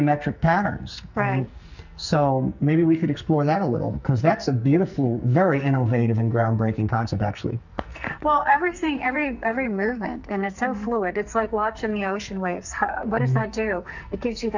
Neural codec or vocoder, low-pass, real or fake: codec, 44.1 kHz, 2.6 kbps, SNAC; 7.2 kHz; fake